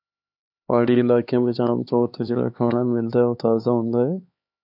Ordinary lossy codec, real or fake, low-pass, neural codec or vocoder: AAC, 48 kbps; fake; 5.4 kHz; codec, 16 kHz, 4 kbps, X-Codec, HuBERT features, trained on LibriSpeech